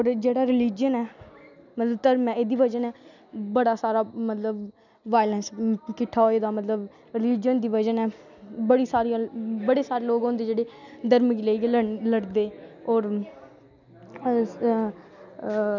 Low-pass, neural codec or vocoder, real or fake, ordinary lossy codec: 7.2 kHz; none; real; none